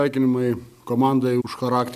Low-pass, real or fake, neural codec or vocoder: 14.4 kHz; real; none